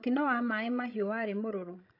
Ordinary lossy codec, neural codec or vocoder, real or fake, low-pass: AAC, 32 kbps; codec, 16 kHz, 16 kbps, FreqCodec, larger model; fake; 5.4 kHz